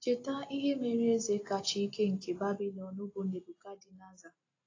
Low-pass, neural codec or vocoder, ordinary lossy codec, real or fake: 7.2 kHz; none; AAC, 32 kbps; real